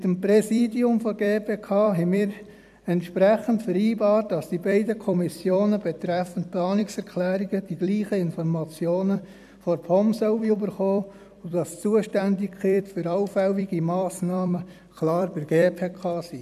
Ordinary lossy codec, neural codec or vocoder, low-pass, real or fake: none; vocoder, 44.1 kHz, 128 mel bands every 256 samples, BigVGAN v2; 14.4 kHz; fake